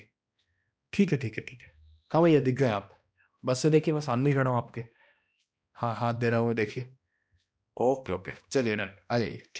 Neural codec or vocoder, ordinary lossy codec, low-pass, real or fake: codec, 16 kHz, 1 kbps, X-Codec, HuBERT features, trained on balanced general audio; none; none; fake